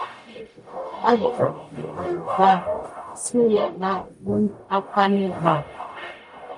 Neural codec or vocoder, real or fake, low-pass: codec, 44.1 kHz, 0.9 kbps, DAC; fake; 10.8 kHz